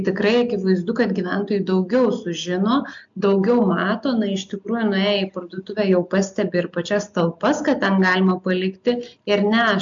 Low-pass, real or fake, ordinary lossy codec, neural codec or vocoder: 7.2 kHz; real; MP3, 64 kbps; none